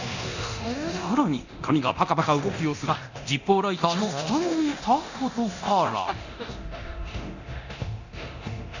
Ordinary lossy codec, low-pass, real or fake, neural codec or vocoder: none; 7.2 kHz; fake; codec, 24 kHz, 0.9 kbps, DualCodec